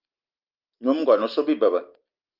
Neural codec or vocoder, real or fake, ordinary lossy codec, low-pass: none; real; Opus, 24 kbps; 5.4 kHz